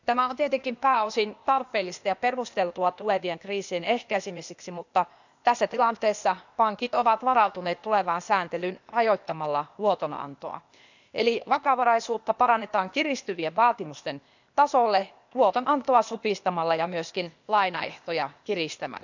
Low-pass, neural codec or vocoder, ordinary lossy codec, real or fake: 7.2 kHz; codec, 16 kHz, 0.8 kbps, ZipCodec; none; fake